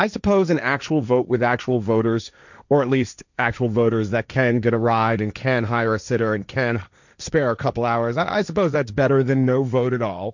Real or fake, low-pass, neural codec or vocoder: fake; 7.2 kHz; codec, 16 kHz, 1.1 kbps, Voila-Tokenizer